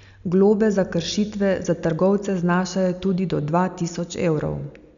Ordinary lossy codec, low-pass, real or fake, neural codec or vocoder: none; 7.2 kHz; real; none